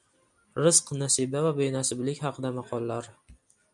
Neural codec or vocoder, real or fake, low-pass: none; real; 10.8 kHz